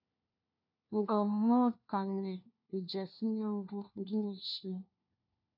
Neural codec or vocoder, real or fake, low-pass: codec, 16 kHz, 1 kbps, FunCodec, trained on LibriTTS, 50 frames a second; fake; 5.4 kHz